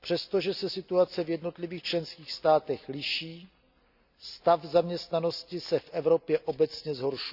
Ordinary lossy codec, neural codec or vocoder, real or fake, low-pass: none; none; real; 5.4 kHz